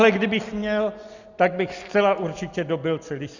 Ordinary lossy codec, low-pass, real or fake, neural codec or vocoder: Opus, 64 kbps; 7.2 kHz; real; none